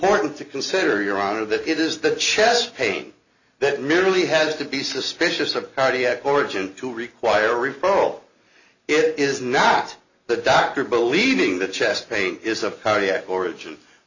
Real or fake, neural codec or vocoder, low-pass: real; none; 7.2 kHz